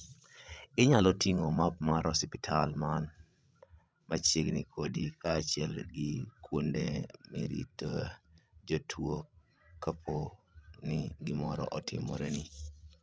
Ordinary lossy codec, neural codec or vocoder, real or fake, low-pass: none; codec, 16 kHz, 16 kbps, FreqCodec, larger model; fake; none